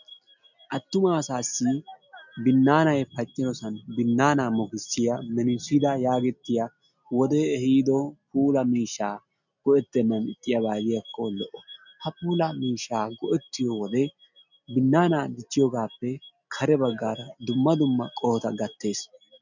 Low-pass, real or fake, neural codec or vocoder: 7.2 kHz; real; none